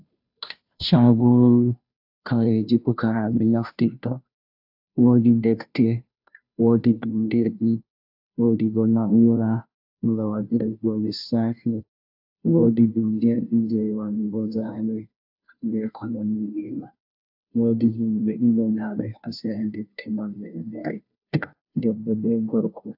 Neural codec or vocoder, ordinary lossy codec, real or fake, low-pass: codec, 16 kHz, 0.5 kbps, FunCodec, trained on Chinese and English, 25 frames a second; AAC, 48 kbps; fake; 5.4 kHz